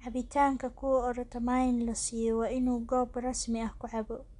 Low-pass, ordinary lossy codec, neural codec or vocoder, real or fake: 10.8 kHz; none; none; real